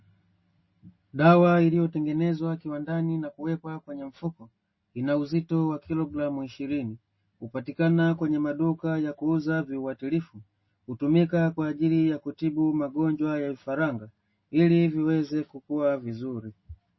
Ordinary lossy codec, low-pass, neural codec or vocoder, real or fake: MP3, 24 kbps; 7.2 kHz; none; real